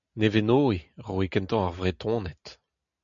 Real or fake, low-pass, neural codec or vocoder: real; 7.2 kHz; none